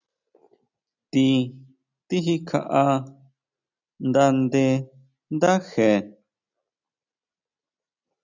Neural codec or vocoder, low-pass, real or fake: none; 7.2 kHz; real